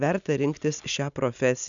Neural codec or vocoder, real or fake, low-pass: none; real; 7.2 kHz